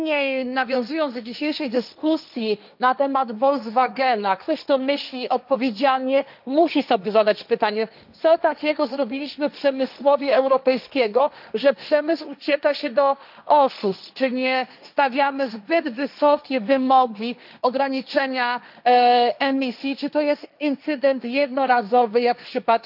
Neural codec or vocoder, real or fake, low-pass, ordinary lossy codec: codec, 16 kHz, 1.1 kbps, Voila-Tokenizer; fake; 5.4 kHz; none